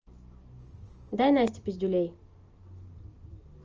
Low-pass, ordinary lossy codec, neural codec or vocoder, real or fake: 7.2 kHz; Opus, 24 kbps; none; real